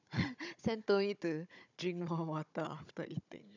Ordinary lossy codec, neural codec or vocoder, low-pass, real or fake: none; codec, 16 kHz, 16 kbps, FunCodec, trained on Chinese and English, 50 frames a second; 7.2 kHz; fake